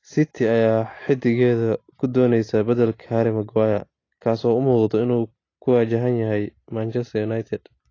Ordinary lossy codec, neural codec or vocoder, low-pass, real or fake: AAC, 32 kbps; none; 7.2 kHz; real